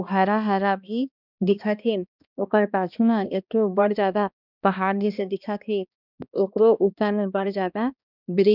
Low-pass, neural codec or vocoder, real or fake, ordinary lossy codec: 5.4 kHz; codec, 16 kHz, 1 kbps, X-Codec, HuBERT features, trained on balanced general audio; fake; none